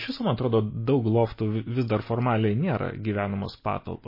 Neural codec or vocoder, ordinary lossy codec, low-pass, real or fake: none; MP3, 24 kbps; 5.4 kHz; real